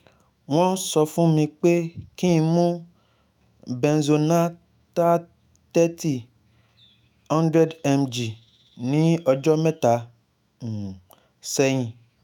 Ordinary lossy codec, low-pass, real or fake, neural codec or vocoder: none; 19.8 kHz; fake; autoencoder, 48 kHz, 128 numbers a frame, DAC-VAE, trained on Japanese speech